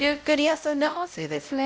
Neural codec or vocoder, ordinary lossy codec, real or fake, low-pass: codec, 16 kHz, 0.5 kbps, X-Codec, WavLM features, trained on Multilingual LibriSpeech; none; fake; none